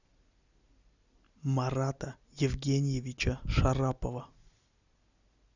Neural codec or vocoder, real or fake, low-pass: none; real; 7.2 kHz